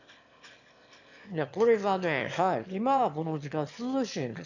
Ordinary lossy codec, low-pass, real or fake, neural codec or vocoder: none; 7.2 kHz; fake; autoencoder, 22.05 kHz, a latent of 192 numbers a frame, VITS, trained on one speaker